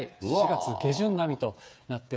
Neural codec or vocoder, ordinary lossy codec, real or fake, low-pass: codec, 16 kHz, 8 kbps, FreqCodec, smaller model; none; fake; none